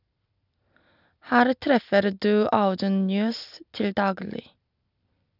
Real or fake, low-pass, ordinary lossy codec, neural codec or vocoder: real; 5.4 kHz; none; none